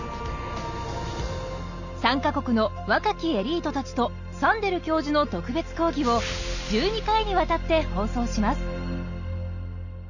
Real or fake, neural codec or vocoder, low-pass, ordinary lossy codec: real; none; 7.2 kHz; none